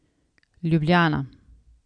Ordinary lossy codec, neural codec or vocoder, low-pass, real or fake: none; none; 9.9 kHz; real